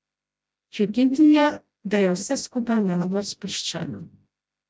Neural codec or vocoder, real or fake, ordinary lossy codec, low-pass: codec, 16 kHz, 0.5 kbps, FreqCodec, smaller model; fake; none; none